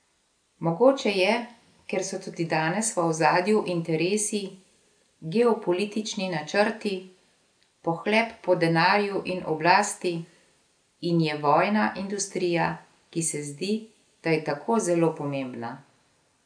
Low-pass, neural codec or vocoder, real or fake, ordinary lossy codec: 9.9 kHz; none; real; MP3, 96 kbps